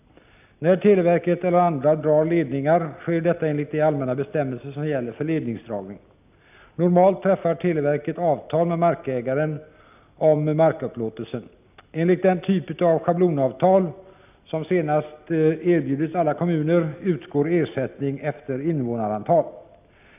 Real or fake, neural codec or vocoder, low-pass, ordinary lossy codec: real; none; 3.6 kHz; none